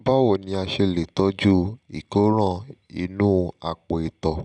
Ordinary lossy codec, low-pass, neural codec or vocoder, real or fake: none; 9.9 kHz; none; real